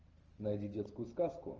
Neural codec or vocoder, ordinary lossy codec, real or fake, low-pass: none; Opus, 24 kbps; real; 7.2 kHz